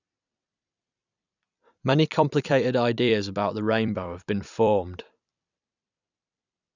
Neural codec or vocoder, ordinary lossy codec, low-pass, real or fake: vocoder, 44.1 kHz, 128 mel bands every 256 samples, BigVGAN v2; none; 7.2 kHz; fake